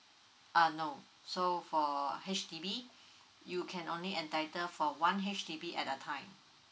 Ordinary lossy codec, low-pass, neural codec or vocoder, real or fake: none; none; none; real